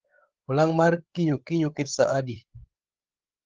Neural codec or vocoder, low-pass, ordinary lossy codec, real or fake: codec, 16 kHz, 8 kbps, FreqCodec, larger model; 7.2 kHz; Opus, 16 kbps; fake